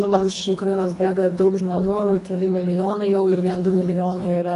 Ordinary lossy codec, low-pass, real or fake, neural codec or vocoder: AAC, 48 kbps; 10.8 kHz; fake; codec, 24 kHz, 1.5 kbps, HILCodec